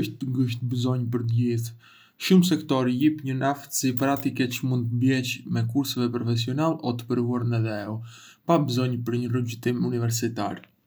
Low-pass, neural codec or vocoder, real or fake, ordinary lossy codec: none; none; real; none